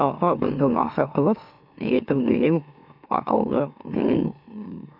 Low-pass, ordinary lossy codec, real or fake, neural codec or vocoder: 5.4 kHz; none; fake; autoencoder, 44.1 kHz, a latent of 192 numbers a frame, MeloTTS